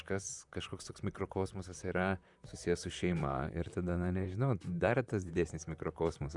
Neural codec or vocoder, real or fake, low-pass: vocoder, 44.1 kHz, 128 mel bands, Pupu-Vocoder; fake; 10.8 kHz